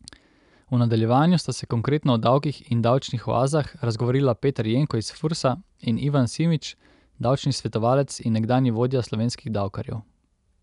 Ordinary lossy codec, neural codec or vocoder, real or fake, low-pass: none; none; real; 10.8 kHz